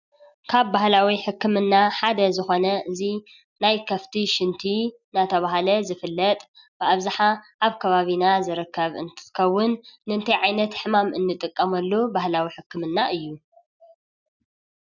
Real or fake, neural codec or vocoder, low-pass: real; none; 7.2 kHz